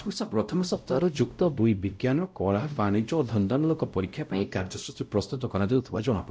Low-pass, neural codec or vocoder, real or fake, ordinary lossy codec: none; codec, 16 kHz, 0.5 kbps, X-Codec, WavLM features, trained on Multilingual LibriSpeech; fake; none